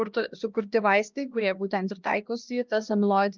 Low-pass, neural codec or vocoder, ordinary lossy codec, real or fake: 7.2 kHz; codec, 16 kHz, 1 kbps, X-Codec, HuBERT features, trained on LibriSpeech; Opus, 32 kbps; fake